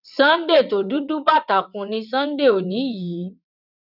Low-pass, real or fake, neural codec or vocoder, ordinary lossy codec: 5.4 kHz; fake; vocoder, 22.05 kHz, 80 mel bands, WaveNeXt; none